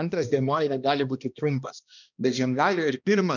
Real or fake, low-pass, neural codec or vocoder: fake; 7.2 kHz; codec, 16 kHz, 1 kbps, X-Codec, HuBERT features, trained on balanced general audio